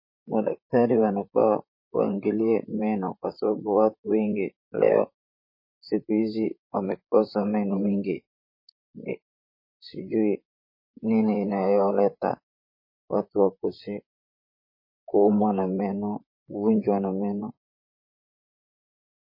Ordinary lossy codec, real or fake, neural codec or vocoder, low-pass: MP3, 24 kbps; fake; vocoder, 44.1 kHz, 128 mel bands, Pupu-Vocoder; 5.4 kHz